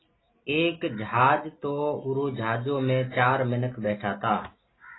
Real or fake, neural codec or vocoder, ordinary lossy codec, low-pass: real; none; AAC, 16 kbps; 7.2 kHz